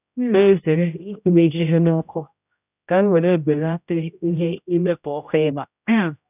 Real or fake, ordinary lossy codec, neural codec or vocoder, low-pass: fake; none; codec, 16 kHz, 0.5 kbps, X-Codec, HuBERT features, trained on general audio; 3.6 kHz